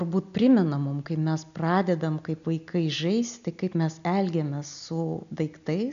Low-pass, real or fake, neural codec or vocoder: 7.2 kHz; real; none